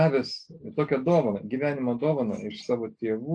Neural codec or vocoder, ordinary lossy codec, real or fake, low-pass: none; AAC, 48 kbps; real; 9.9 kHz